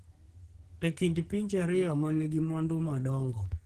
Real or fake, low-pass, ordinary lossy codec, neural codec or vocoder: fake; 14.4 kHz; Opus, 16 kbps; codec, 44.1 kHz, 2.6 kbps, SNAC